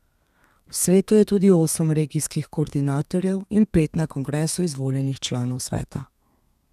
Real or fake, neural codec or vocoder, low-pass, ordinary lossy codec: fake; codec, 32 kHz, 1.9 kbps, SNAC; 14.4 kHz; none